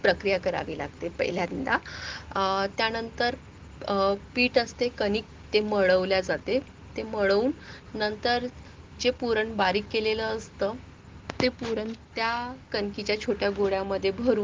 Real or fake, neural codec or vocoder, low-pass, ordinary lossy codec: real; none; 7.2 kHz; Opus, 16 kbps